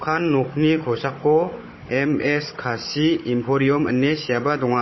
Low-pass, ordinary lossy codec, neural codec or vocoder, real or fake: 7.2 kHz; MP3, 24 kbps; codec, 16 kHz, 16 kbps, FunCodec, trained on Chinese and English, 50 frames a second; fake